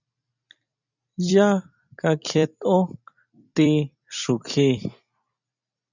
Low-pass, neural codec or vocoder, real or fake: 7.2 kHz; vocoder, 44.1 kHz, 128 mel bands every 256 samples, BigVGAN v2; fake